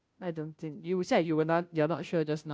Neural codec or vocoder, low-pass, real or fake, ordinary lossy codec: codec, 16 kHz, 0.5 kbps, FunCodec, trained on Chinese and English, 25 frames a second; none; fake; none